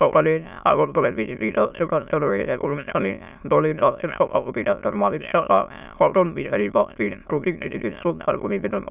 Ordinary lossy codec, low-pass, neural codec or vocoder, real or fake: none; 3.6 kHz; autoencoder, 22.05 kHz, a latent of 192 numbers a frame, VITS, trained on many speakers; fake